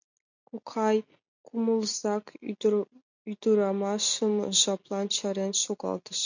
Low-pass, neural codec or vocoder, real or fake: 7.2 kHz; none; real